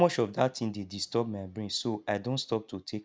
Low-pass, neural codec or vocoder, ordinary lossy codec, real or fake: none; none; none; real